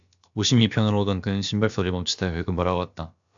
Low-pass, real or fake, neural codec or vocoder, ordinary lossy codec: 7.2 kHz; fake; codec, 16 kHz, about 1 kbps, DyCAST, with the encoder's durations; AAC, 64 kbps